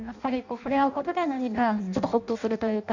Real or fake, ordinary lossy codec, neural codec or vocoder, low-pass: fake; none; codec, 16 kHz in and 24 kHz out, 0.6 kbps, FireRedTTS-2 codec; 7.2 kHz